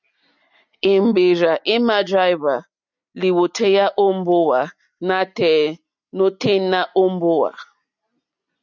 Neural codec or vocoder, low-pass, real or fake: none; 7.2 kHz; real